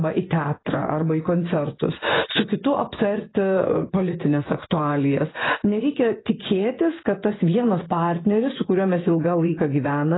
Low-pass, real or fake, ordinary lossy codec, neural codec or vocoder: 7.2 kHz; fake; AAC, 16 kbps; vocoder, 24 kHz, 100 mel bands, Vocos